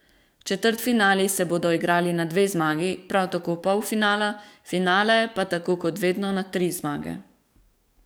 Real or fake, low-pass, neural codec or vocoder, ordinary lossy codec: fake; none; codec, 44.1 kHz, 7.8 kbps, DAC; none